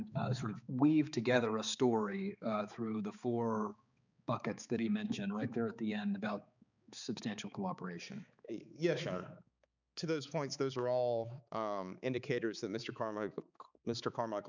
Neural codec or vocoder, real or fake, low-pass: codec, 16 kHz, 4 kbps, X-Codec, HuBERT features, trained on balanced general audio; fake; 7.2 kHz